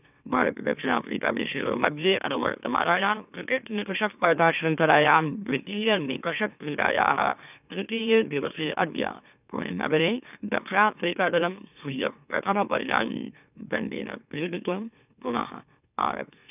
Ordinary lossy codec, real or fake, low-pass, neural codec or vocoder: none; fake; 3.6 kHz; autoencoder, 44.1 kHz, a latent of 192 numbers a frame, MeloTTS